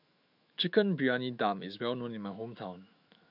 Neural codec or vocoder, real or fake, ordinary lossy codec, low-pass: autoencoder, 48 kHz, 128 numbers a frame, DAC-VAE, trained on Japanese speech; fake; none; 5.4 kHz